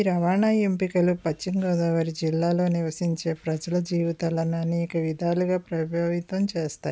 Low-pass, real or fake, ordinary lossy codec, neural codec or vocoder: none; real; none; none